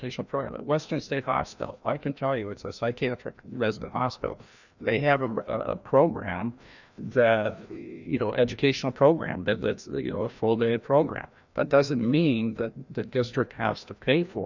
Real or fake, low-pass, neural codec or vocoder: fake; 7.2 kHz; codec, 16 kHz, 1 kbps, FreqCodec, larger model